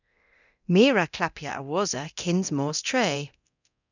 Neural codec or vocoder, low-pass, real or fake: codec, 24 kHz, 0.9 kbps, DualCodec; 7.2 kHz; fake